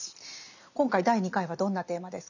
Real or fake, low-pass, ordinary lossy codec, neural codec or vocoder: real; 7.2 kHz; none; none